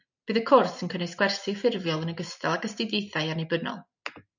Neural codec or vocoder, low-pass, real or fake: none; 7.2 kHz; real